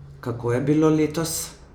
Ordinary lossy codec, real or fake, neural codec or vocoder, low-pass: none; real; none; none